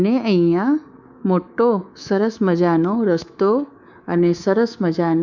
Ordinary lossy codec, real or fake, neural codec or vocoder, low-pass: none; fake; codec, 24 kHz, 3.1 kbps, DualCodec; 7.2 kHz